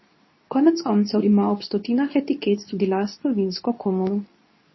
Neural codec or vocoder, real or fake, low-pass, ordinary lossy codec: codec, 24 kHz, 0.9 kbps, WavTokenizer, medium speech release version 2; fake; 7.2 kHz; MP3, 24 kbps